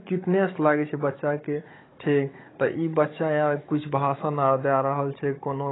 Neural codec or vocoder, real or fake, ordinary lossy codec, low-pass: codec, 16 kHz, 16 kbps, FunCodec, trained on Chinese and English, 50 frames a second; fake; AAC, 16 kbps; 7.2 kHz